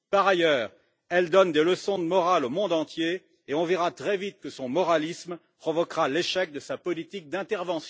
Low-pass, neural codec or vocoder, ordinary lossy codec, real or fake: none; none; none; real